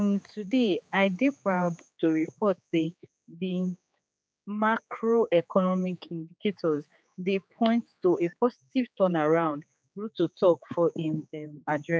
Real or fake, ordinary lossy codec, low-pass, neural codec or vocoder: fake; none; none; codec, 16 kHz, 4 kbps, X-Codec, HuBERT features, trained on general audio